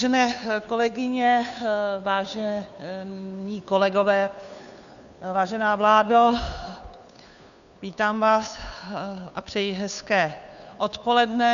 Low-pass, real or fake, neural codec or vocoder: 7.2 kHz; fake; codec, 16 kHz, 2 kbps, FunCodec, trained on Chinese and English, 25 frames a second